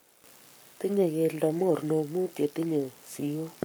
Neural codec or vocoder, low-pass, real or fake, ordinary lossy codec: codec, 44.1 kHz, 7.8 kbps, Pupu-Codec; none; fake; none